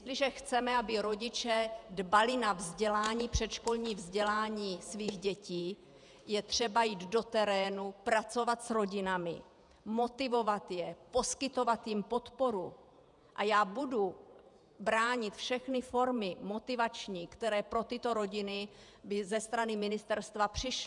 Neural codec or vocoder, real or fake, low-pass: vocoder, 48 kHz, 128 mel bands, Vocos; fake; 10.8 kHz